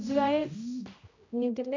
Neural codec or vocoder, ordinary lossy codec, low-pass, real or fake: codec, 16 kHz, 0.5 kbps, X-Codec, HuBERT features, trained on general audio; MP3, 64 kbps; 7.2 kHz; fake